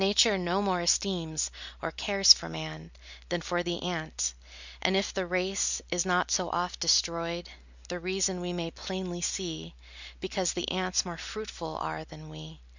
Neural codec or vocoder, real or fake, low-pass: none; real; 7.2 kHz